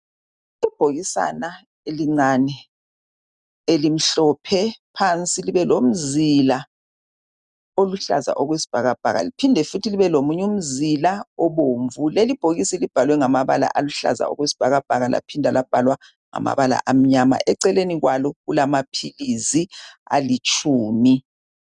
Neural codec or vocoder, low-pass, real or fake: none; 10.8 kHz; real